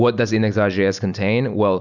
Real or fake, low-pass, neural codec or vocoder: real; 7.2 kHz; none